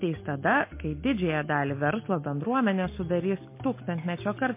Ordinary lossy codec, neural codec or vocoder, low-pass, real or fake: MP3, 24 kbps; none; 3.6 kHz; real